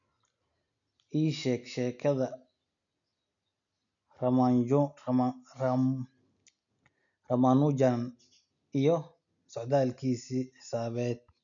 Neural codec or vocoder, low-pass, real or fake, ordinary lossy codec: none; 7.2 kHz; real; none